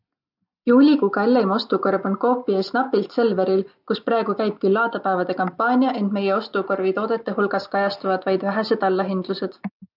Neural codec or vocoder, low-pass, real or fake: none; 5.4 kHz; real